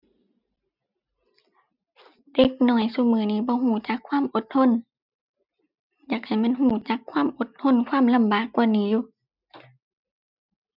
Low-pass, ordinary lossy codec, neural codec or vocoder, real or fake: 5.4 kHz; none; none; real